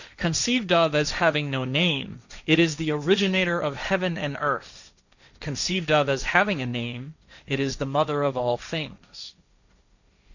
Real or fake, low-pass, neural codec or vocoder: fake; 7.2 kHz; codec, 16 kHz, 1.1 kbps, Voila-Tokenizer